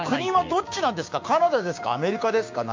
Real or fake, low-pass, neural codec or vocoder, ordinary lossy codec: fake; 7.2 kHz; codec, 16 kHz, 6 kbps, DAC; MP3, 48 kbps